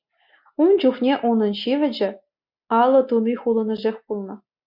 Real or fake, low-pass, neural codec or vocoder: real; 5.4 kHz; none